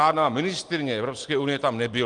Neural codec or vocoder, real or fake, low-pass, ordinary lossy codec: none; real; 9.9 kHz; Opus, 24 kbps